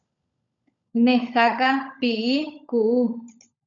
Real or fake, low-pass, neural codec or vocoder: fake; 7.2 kHz; codec, 16 kHz, 16 kbps, FunCodec, trained on LibriTTS, 50 frames a second